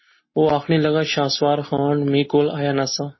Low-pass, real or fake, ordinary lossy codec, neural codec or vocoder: 7.2 kHz; real; MP3, 24 kbps; none